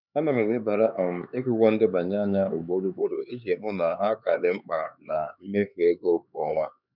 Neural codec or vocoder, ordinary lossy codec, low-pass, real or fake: codec, 16 kHz, 4 kbps, X-Codec, HuBERT features, trained on LibriSpeech; none; 5.4 kHz; fake